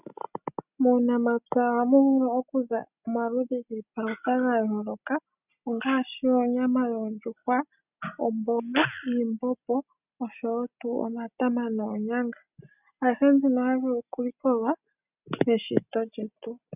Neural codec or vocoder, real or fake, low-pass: none; real; 3.6 kHz